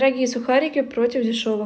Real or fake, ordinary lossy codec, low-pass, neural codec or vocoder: real; none; none; none